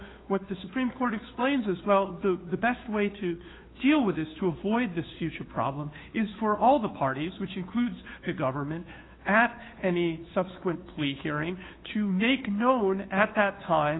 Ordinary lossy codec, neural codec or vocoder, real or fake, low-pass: AAC, 16 kbps; codec, 16 kHz, 2 kbps, FunCodec, trained on Chinese and English, 25 frames a second; fake; 7.2 kHz